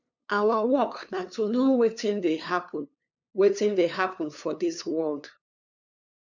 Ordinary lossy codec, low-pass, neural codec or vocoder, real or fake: none; 7.2 kHz; codec, 16 kHz, 2 kbps, FunCodec, trained on LibriTTS, 25 frames a second; fake